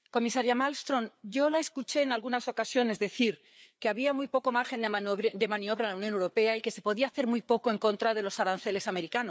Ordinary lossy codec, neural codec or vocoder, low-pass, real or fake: none; codec, 16 kHz, 4 kbps, FreqCodec, larger model; none; fake